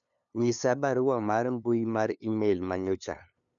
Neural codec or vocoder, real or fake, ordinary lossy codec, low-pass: codec, 16 kHz, 2 kbps, FunCodec, trained on LibriTTS, 25 frames a second; fake; none; 7.2 kHz